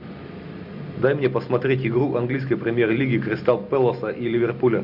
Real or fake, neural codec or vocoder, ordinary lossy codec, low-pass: real; none; AAC, 48 kbps; 5.4 kHz